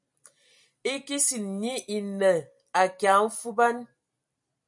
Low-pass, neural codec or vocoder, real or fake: 10.8 kHz; vocoder, 44.1 kHz, 128 mel bands every 256 samples, BigVGAN v2; fake